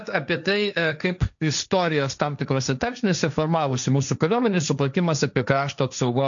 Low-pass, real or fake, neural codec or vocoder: 7.2 kHz; fake; codec, 16 kHz, 1.1 kbps, Voila-Tokenizer